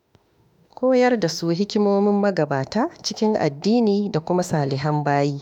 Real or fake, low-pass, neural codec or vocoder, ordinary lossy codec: fake; 19.8 kHz; autoencoder, 48 kHz, 32 numbers a frame, DAC-VAE, trained on Japanese speech; none